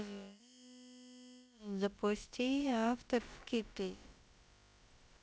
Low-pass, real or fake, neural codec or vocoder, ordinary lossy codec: none; fake; codec, 16 kHz, about 1 kbps, DyCAST, with the encoder's durations; none